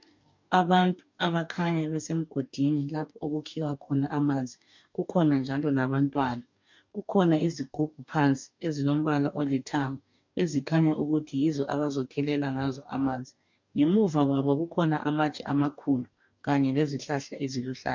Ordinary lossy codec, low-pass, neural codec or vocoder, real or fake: MP3, 64 kbps; 7.2 kHz; codec, 44.1 kHz, 2.6 kbps, DAC; fake